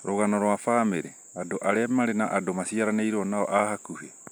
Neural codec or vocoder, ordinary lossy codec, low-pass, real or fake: none; none; none; real